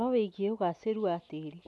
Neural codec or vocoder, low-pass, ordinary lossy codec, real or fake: none; none; none; real